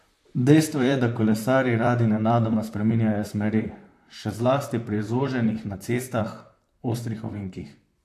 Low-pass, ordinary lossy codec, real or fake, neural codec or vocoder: 14.4 kHz; AAC, 64 kbps; fake; vocoder, 44.1 kHz, 128 mel bands, Pupu-Vocoder